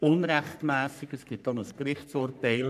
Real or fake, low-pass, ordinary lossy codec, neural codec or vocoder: fake; 14.4 kHz; none; codec, 44.1 kHz, 3.4 kbps, Pupu-Codec